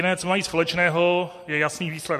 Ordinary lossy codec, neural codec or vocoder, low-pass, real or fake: MP3, 64 kbps; none; 14.4 kHz; real